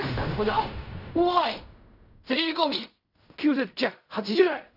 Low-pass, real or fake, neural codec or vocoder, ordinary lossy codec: 5.4 kHz; fake; codec, 16 kHz in and 24 kHz out, 0.4 kbps, LongCat-Audio-Codec, fine tuned four codebook decoder; none